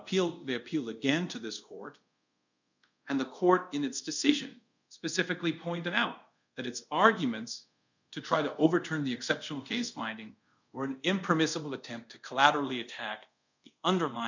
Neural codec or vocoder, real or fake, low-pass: codec, 24 kHz, 0.5 kbps, DualCodec; fake; 7.2 kHz